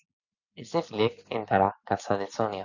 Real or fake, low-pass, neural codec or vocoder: real; 7.2 kHz; none